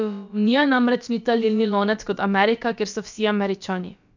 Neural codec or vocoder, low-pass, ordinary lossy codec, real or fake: codec, 16 kHz, about 1 kbps, DyCAST, with the encoder's durations; 7.2 kHz; none; fake